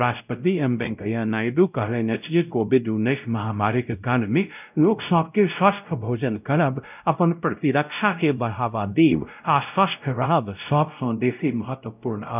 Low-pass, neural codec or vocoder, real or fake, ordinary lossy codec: 3.6 kHz; codec, 16 kHz, 0.5 kbps, X-Codec, WavLM features, trained on Multilingual LibriSpeech; fake; none